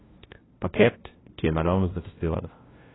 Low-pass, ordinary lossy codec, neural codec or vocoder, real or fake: 7.2 kHz; AAC, 16 kbps; codec, 16 kHz, 0.5 kbps, FunCodec, trained on LibriTTS, 25 frames a second; fake